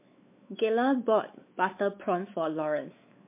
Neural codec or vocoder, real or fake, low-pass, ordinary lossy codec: codec, 16 kHz, 4 kbps, X-Codec, WavLM features, trained on Multilingual LibriSpeech; fake; 3.6 kHz; MP3, 24 kbps